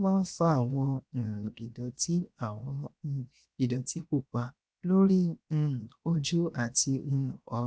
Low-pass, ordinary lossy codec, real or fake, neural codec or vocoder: none; none; fake; codec, 16 kHz, 0.7 kbps, FocalCodec